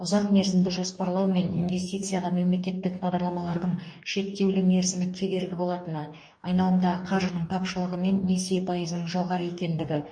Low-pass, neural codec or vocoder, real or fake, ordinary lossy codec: 9.9 kHz; codec, 44.1 kHz, 2.6 kbps, DAC; fake; MP3, 48 kbps